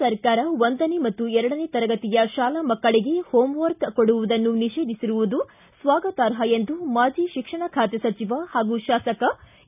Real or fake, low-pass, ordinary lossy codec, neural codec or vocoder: real; 3.6 kHz; none; none